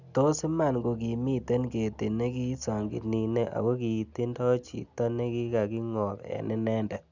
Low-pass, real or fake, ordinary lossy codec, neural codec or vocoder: 7.2 kHz; real; none; none